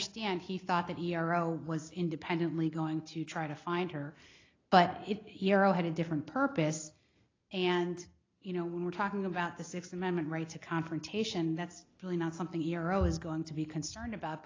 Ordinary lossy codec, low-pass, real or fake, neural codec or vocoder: AAC, 32 kbps; 7.2 kHz; real; none